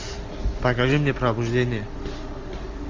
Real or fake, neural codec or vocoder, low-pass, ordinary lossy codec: real; none; 7.2 kHz; MP3, 48 kbps